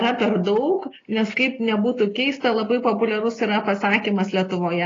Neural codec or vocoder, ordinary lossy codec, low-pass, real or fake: none; AAC, 32 kbps; 7.2 kHz; real